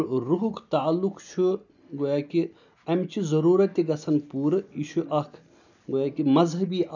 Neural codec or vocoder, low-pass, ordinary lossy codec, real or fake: none; 7.2 kHz; none; real